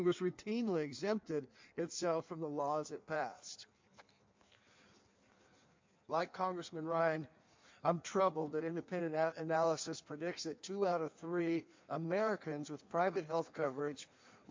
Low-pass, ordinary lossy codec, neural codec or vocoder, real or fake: 7.2 kHz; MP3, 48 kbps; codec, 16 kHz in and 24 kHz out, 1.1 kbps, FireRedTTS-2 codec; fake